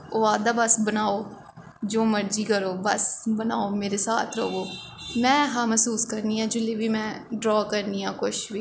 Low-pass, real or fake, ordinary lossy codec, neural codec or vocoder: none; real; none; none